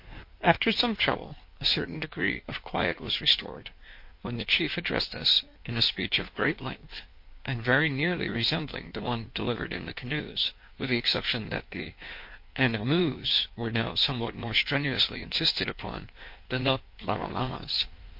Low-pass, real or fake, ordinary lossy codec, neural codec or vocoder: 5.4 kHz; fake; MP3, 32 kbps; codec, 16 kHz in and 24 kHz out, 1.1 kbps, FireRedTTS-2 codec